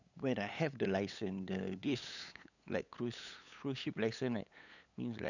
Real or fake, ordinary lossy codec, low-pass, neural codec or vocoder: fake; none; 7.2 kHz; codec, 16 kHz, 8 kbps, FunCodec, trained on LibriTTS, 25 frames a second